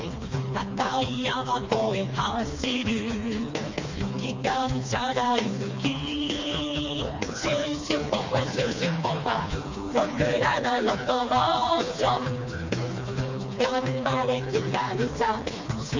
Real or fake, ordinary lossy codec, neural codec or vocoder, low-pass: fake; MP3, 48 kbps; codec, 16 kHz, 2 kbps, FreqCodec, smaller model; 7.2 kHz